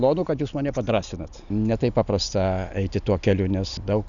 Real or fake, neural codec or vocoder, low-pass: real; none; 7.2 kHz